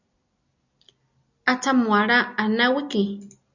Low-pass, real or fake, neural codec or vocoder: 7.2 kHz; real; none